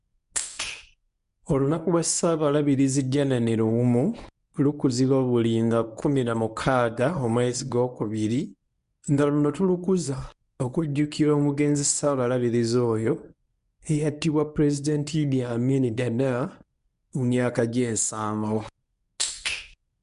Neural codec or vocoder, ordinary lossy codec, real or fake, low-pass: codec, 24 kHz, 0.9 kbps, WavTokenizer, medium speech release version 1; none; fake; 10.8 kHz